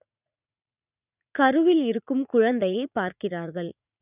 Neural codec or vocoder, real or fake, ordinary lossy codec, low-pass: vocoder, 44.1 kHz, 80 mel bands, Vocos; fake; none; 3.6 kHz